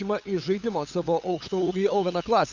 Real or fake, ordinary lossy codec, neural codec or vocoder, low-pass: fake; Opus, 64 kbps; codec, 16 kHz, 8 kbps, FunCodec, trained on LibriTTS, 25 frames a second; 7.2 kHz